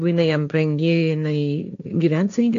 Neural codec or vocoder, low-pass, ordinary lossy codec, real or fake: codec, 16 kHz, 1.1 kbps, Voila-Tokenizer; 7.2 kHz; AAC, 64 kbps; fake